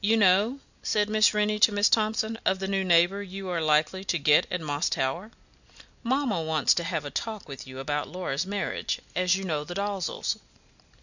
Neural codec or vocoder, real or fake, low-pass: none; real; 7.2 kHz